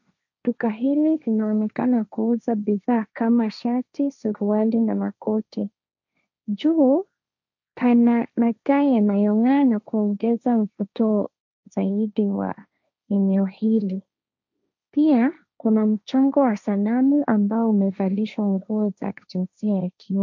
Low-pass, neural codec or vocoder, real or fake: 7.2 kHz; codec, 16 kHz, 1.1 kbps, Voila-Tokenizer; fake